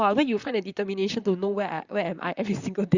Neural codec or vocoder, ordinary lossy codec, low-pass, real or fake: vocoder, 44.1 kHz, 128 mel bands, Pupu-Vocoder; Opus, 64 kbps; 7.2 kHz; fake